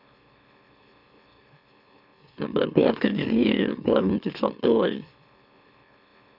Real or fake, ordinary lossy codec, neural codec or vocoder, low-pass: fake; none; autoencoder, 44.1 kHz, a latent of 192 numbers a frame, MeloTTS; 5.4 kHz